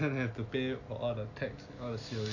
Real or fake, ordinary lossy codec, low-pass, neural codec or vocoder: real; none; 7.2 kHz; none